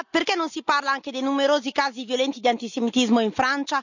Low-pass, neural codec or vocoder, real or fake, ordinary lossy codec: 7.2 kHz; none; real; none